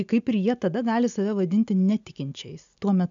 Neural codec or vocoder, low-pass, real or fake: none; 7.2 kHz; real